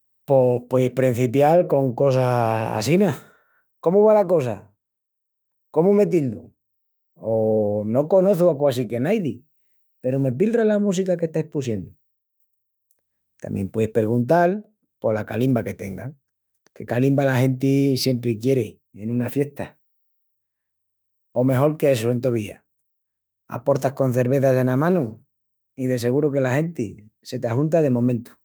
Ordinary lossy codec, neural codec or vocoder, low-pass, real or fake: none; autoencoder, 48 kHz, 32 numbers a frame, DAC-VAE, trained on Japanese speech; none; fake